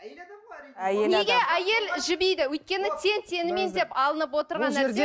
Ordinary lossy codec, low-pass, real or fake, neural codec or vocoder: none; none; real; none